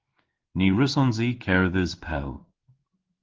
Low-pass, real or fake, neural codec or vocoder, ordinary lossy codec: 7.2 kHz; fake; codec, 16 kHz in and 24 kHz out, 1 kbps, XY-Tokenizer; Opus, 16 kbps